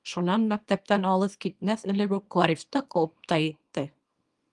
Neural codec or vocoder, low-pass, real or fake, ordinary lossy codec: codec, 24 kHz, 0.9 kbps, WavTokenizer, small release; 10.8 kHz; fake; Opus, 32 kbps